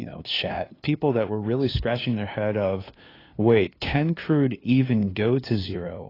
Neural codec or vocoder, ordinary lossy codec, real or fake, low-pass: codec, 16 kHz, 2 kbps, FunCodec, trained on LibriTTS, 25 frames a second; AAC, 24 kbps; fake; 5.4 kHz